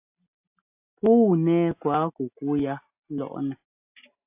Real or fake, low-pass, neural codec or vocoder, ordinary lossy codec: real; 3.6 kHz; none; AAC, 32 kbps